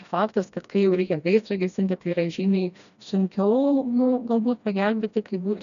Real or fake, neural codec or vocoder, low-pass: fake; codec, 16 kHz, 1 kbps, FreqCodec, smaller model; 7.2 kHz